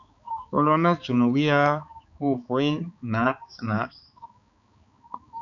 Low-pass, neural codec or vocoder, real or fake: 7.2 kHz; codec, 16 kHz, 4 kbps, X-Codec, HuBERT features, trained on balanced general audio; fake